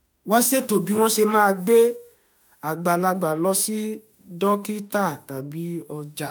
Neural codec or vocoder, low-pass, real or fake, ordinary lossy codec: autoencoder, 48 kHz, 32 numbers a frame, DAC-VAE, trained on Japanese speech; none; fake; none